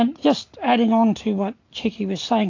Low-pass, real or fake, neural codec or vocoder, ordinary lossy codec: 7.2 kHz; real; none; AAC, 48 kbps